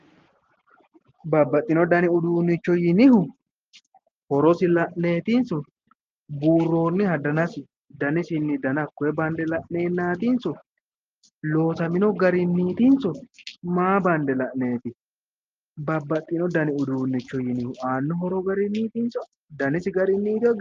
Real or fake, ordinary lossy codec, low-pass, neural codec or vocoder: real; Opus, 24 kbps; 7.2 kHz; none